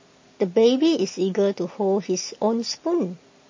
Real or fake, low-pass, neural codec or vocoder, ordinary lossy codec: real; 7.2 kHz; none; MP3, 32 kbps